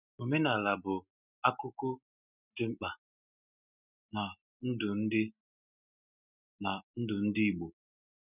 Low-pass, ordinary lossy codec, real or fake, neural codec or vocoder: 3.6 kHz; none; real; none